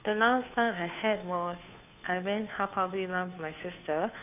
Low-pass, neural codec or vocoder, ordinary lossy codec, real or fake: 3.6 kHz; codec, 16 kHz, 2 kbps, FunCodec, trained on Chinese and English, 25 frames a second; none; fake